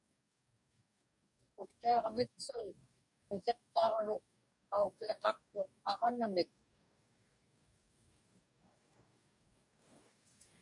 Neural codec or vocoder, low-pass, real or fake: codec, 44.1 kHz, 2.6 kbps, DAC; 10.8 kHz; fake